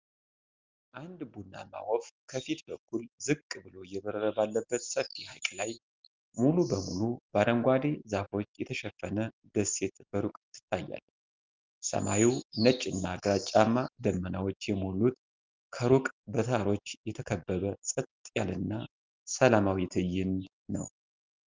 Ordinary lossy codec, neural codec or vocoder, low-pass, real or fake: Opus, 24 kbps; none; 7.2 kHz; real